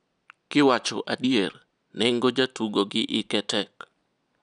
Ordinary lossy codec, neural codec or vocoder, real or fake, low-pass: none; none; real; 9.9 kHz